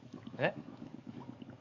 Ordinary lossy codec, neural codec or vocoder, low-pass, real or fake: Opus, 64 kbps; codec, 44.1 kHz, 7.8 kbps, DAC; 7.2 kHz; fake